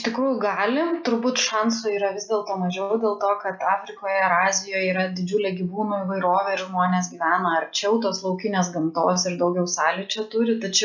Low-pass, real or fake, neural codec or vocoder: 7.2 kHz; real; none